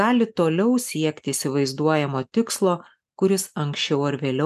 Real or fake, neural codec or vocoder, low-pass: real; none; 14.4 kHz